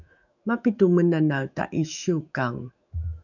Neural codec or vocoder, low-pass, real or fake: autoencoder, 48 kHz, 128 numbers a frame, DAC-VAE, trained on Japanese speech; 7.2 kHz; fake